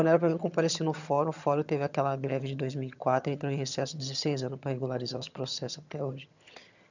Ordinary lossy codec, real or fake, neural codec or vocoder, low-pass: none; fake; vocoder, 22.05 kHz, 80 mel bands, HiFi-GAN; 7.2 kHz